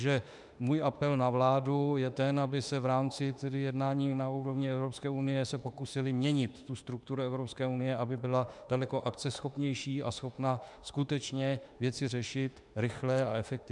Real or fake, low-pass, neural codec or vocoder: fake; 10.8 kHz; autoencoder, 48 kHz, 32 numbers a frame, DAC-VAE, trained on Japanese speech